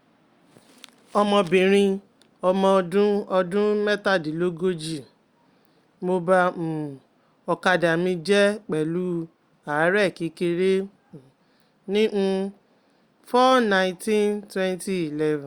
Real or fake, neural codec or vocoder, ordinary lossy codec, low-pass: real; none; Opus, 64 kbps; 19.8 kHz